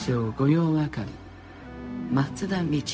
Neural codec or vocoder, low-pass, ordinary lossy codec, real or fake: codec, 16 kHz, 0.4 kbps, LongCat-Audio-Codec; none; none; fake